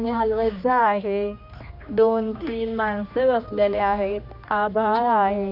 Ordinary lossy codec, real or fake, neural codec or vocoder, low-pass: none; fake; codec, 16 kHz, 2 kbps, X-Codec, HuBERT features, trained on general audio; 5.4 kHz